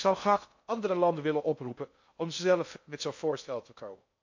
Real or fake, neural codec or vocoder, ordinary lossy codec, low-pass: fake; codec, 16 kHz in and 24 kHz out, 0.6 kbps, FocalCodec, streaming, 2048 codes; MP3, 48 kbps; 7.2 kHz